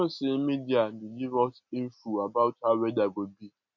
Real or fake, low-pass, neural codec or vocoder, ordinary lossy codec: real; 7.2 kHz; none; none